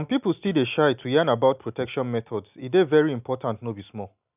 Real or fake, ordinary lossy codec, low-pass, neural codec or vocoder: real; none; 3.6 kHz; none